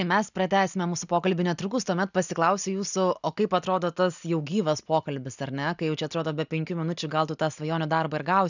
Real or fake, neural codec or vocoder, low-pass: real; none; 7.2 kHz